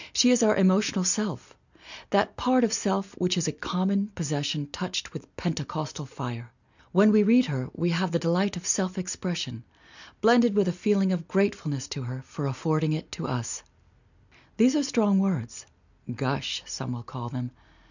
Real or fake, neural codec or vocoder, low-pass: real; none; 7.2 kHz